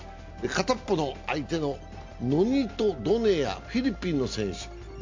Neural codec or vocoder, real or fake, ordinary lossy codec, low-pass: none; real; MP3, 48 kbps; 7.2 kHz